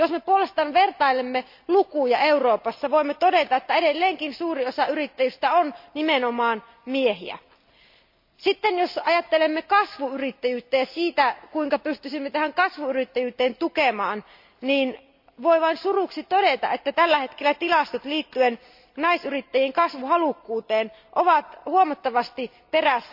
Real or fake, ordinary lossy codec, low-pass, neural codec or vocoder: real; MP3, 48 kbps; 5.4 kHz; none